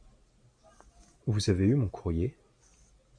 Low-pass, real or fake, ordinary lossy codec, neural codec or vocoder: 9.9 kHz; real; MP3, 64 kbps; none